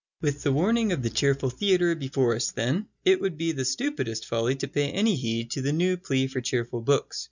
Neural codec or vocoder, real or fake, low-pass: none; real; 7.2 kHz